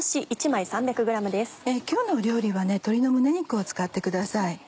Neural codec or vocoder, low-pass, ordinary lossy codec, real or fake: none; none; none; real